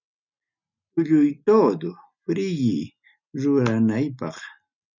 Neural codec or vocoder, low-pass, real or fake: none; 7.2 kHz; real